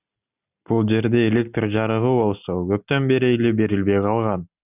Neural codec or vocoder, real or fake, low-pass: none; real; 3.6 kHz